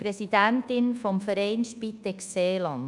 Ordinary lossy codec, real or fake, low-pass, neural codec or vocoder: none; fake; 10.8 kHz; codec, 24 kHz, 1.2 kbps, DualCodec